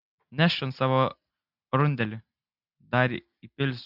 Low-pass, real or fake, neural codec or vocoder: 5.4 kHz; real; none